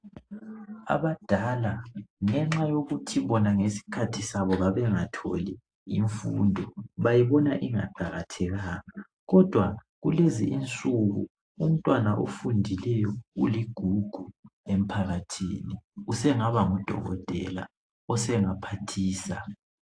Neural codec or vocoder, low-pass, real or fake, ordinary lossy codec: none; 9.9 kHz; real; AAC, 48 kbps